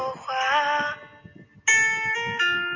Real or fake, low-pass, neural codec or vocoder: real; 7.2 kHz; none